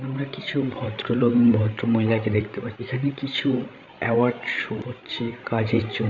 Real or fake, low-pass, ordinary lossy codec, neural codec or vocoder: fake; 7.2 kHz; none; codec, 16 kHz, 16 kbps, FreqCodec, larger model